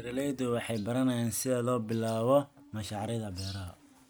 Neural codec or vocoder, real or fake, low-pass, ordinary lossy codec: none; real; none; none